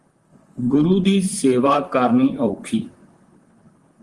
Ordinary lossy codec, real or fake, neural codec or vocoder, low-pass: Opus, 24 kbps; fake; vocoder, 44.1 kHz, 128 mel bands, Pupu-Vocoder; 10.8 kHz